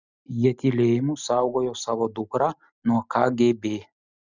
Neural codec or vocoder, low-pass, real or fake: none; 7.2 kHz; real